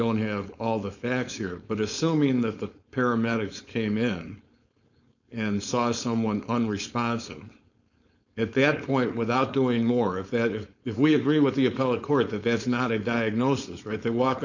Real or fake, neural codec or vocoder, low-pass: fake; codec, 16 kHz, 4.8 kbps, FACodec; 7.2 kHz